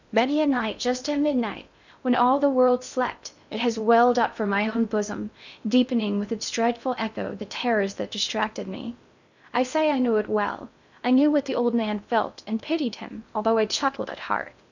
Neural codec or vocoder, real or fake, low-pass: codec, 16 kHz in and 24 kHz out, 0.6 kbps, FocalCodec, streaming, 2048 codes; fake; 7.2 kHz